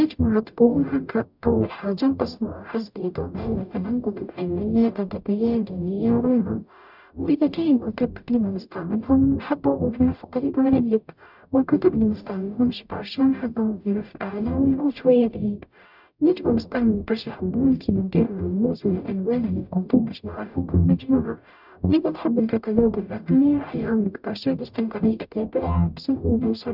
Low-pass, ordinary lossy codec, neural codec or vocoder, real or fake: 5.4 kHz; none; codec, 44.1 kHz, 0.9 kbps, DAC; fake